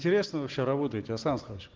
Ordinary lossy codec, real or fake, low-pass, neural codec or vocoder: Opus, 16 kbps; real; 7.2 kHz; none